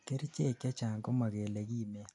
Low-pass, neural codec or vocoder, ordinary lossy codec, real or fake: 10.8 kHz; none; none; real